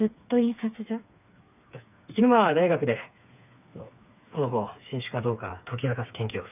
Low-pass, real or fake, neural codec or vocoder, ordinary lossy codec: 3.6 kHz; fake; codec, 16 kHz, 4 kbps, FreqCodec, smaller model; none